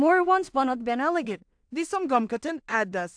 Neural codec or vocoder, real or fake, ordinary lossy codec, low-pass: codec, 16 kHz in and 24 kHz out, 0.4 kbps, LongCat-Audio-Codec, two codebook decoder; fake; AAC, 64 kbps; 9.9 kHz